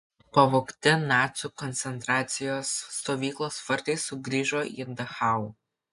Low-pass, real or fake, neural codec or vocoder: 10.8 kHz; real; none